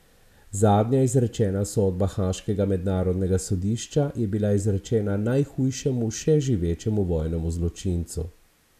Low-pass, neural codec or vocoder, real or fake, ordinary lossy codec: 14.4 kHz; none; real; none